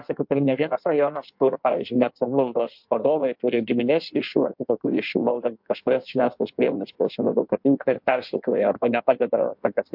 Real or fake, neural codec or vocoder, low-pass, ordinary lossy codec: fake; codec, 16 kHz in and 24 kHz out, 1.1 kbps, FireRedTTS-2 codec; 5.4 kHz; MP3, 48 kbps